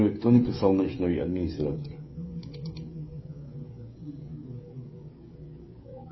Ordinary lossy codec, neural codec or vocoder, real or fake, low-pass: MP3, 24 kbps; codec, 16 kHz, 16 kbps, FreqCodec, smaller model; fake; 7.2 kHz